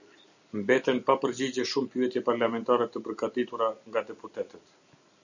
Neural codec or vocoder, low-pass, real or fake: none; 7.2 kHz; real